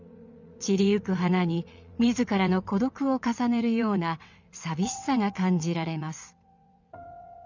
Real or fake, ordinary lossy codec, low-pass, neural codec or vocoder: fake; none; 7.2 kHz; vocoder, 22.05 kHz, 80 mel bands, WaveNeXt